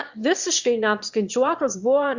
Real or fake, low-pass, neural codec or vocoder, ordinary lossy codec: fake; 7.2 kHz; autoencoder, 22.05 kHz, a latent of 192 numbers a frame, VITS, trained on one speaker; Opus, 64 kbps